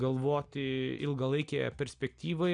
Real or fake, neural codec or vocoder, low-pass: real; none; 9.9 kHz